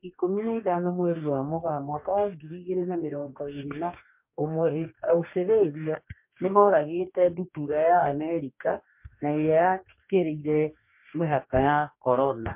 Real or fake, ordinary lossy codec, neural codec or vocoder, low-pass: fake; MP3, 32 kbps; codec, 44.1 kHz, 2.6 kbps, DAC; 3.6 kHz